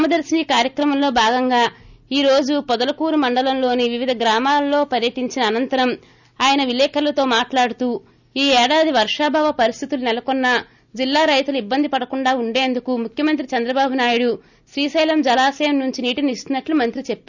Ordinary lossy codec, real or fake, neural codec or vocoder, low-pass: none; real; none; 7.2 kHz